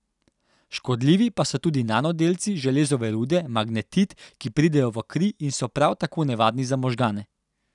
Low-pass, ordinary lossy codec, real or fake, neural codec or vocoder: 10.8 kHz; none; real; none